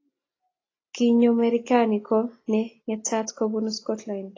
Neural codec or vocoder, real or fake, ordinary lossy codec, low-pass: none; real; AAC, 32 kbps; 7.2 kHz